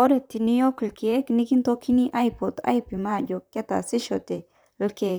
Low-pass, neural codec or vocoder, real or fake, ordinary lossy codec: none; vocoder, 44.1 kHz, 128 mel bands, Pupu-Vocoder; fake; none